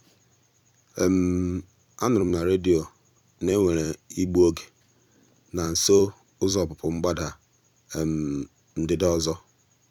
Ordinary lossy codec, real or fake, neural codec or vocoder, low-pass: none; fake; vocoder, 44.1 kHz, 128 mel bands every 256 samples, BigVGAN v2; 19.8 kHz